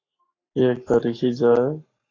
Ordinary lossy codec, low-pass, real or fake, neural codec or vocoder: AAC, 32 kbps; 7.2 kHz; fake; codec, 44.1 kHz, 7.8 kbps, Pupu-Codec